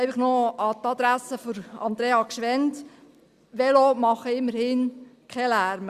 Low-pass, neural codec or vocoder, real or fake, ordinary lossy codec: 14.4 kHz; none; real; Opus, 64 kbps